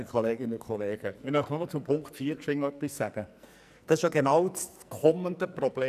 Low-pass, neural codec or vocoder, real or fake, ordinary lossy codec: 14.4 kHz; codec, 44.1 kHz, 2.6 kbps, SNAC; fake; none